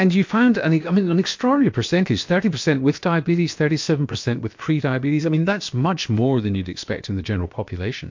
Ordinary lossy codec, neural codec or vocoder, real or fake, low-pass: MP3, 48 kbps; codec, 16 kHz, about 1 kbps, DyCAST, with the encoder's durations; fake; 7.2 kHz